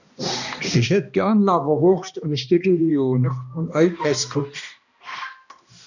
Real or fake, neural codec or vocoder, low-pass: fake; codec, 16 kHz, 1 kbps, X-Codec, HuBERT features, trained on balanced general audio; 7.2 kHz